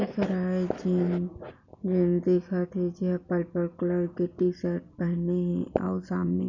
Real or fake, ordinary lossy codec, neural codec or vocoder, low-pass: real; none; none; 7.2 kHz